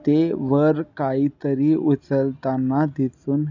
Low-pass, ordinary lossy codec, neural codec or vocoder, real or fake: 7.2 kHz; none; none; real